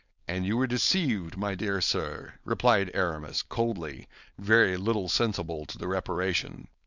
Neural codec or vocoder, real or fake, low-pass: codec, 16 kHz, 4.8 kbps, FACodec; fake; 7.2 kHz